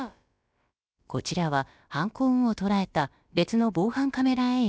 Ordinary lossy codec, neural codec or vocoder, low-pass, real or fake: none; codec, 16 kHz, about 1 kbps, DyCAST, with the encoder's durations; none; fake